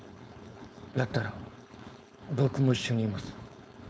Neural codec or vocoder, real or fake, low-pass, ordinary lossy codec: codec, 16 kHz, 4.8 kbps, FACodec; fake; none; none